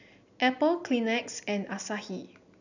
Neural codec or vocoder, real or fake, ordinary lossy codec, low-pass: none; real; none; 7.2 kHz